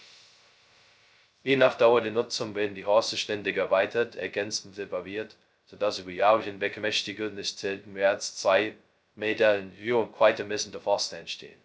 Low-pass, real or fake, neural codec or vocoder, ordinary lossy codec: none; fake; codec, 16 kHz, 0.2 kbps, FocalCodec; none